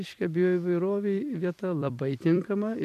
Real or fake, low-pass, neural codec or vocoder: fake; 14.4 kHz; vocoder, 44.1 kHz, 128 mel bands every 256 samples, BigVGAN v2